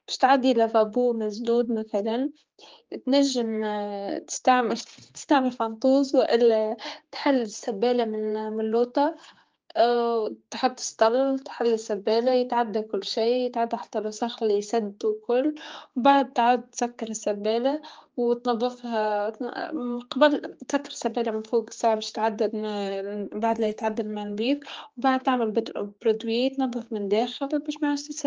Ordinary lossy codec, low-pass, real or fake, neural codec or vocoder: Opus, 24 kbps; 7.2 kHz; fake; codec, 16 kHz, 4 kbps, X-Codec, HuBERT features, trained on general audio